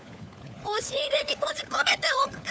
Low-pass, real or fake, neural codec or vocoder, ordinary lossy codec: none; fake; codec, 16 kHz, 4 kbps, FunCodec, trained on LibriTTS, 50 frames a second; none